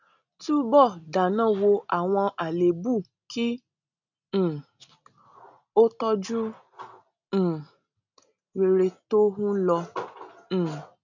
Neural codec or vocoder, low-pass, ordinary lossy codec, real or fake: none; 7.2 kHz; none; real